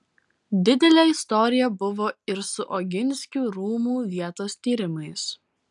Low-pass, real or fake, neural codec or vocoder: 10.8 kHz; real; none